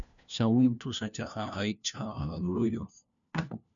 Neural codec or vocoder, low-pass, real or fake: codec, 16 kHz, 1 kbps, FunCodec, trained on LibriTTS, 50 frames a second; 7.2 kHz; fake